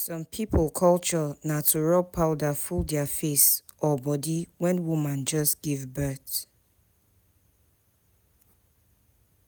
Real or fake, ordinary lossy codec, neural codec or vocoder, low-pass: real; none; none; none